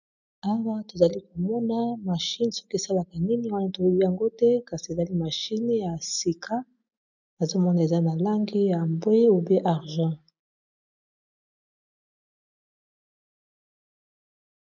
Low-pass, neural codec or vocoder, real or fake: 7.2 kHz; none; real